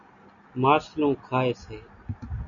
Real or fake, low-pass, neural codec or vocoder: real; 7.2 kHz; none